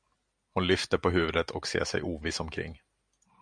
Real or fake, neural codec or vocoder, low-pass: real; none; 9.9 kHz